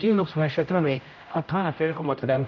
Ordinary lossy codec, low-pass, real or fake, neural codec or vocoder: none; 7.2 kHz; fake; codec, 16 kHz, 0.5 kbps, X-Codec, HuBERT features, trained on general audio